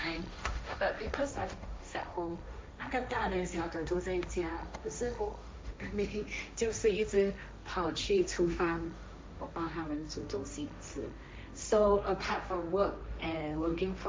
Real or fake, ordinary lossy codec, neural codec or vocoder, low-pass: fake; none; codec, 16 kHz, 1.1 kbps, Voila-Tokenizer; none